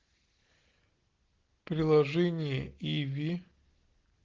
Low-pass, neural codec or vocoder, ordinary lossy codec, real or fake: 7.2 kHz; none; Opus, 16 kbps; real